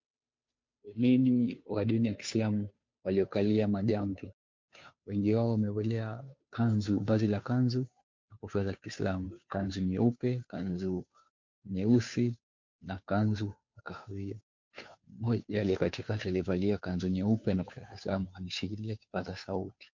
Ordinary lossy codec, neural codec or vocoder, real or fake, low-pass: MP3, 48 kbps; codec, 16 kHz, 2 kbps, FunCodec, trained on Chinese and English, 25 frames a second; fake; 7.2 kHz